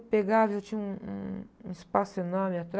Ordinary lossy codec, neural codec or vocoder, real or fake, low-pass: none; none; real; none